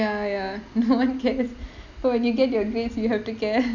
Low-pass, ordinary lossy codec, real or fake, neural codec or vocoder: 7.2 kHz; none; real; none